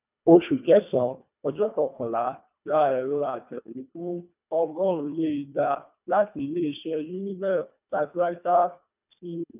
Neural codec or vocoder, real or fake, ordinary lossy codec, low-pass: codec, 24 kHz, 1.5 kbps, HILCodec; fake; none; 3.6 kHz